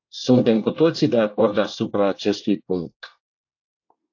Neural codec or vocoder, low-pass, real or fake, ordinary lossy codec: codec, 24 kHz, 1 kbps, SNAC; 7.2 kHz; fake; AAC, 48 kbps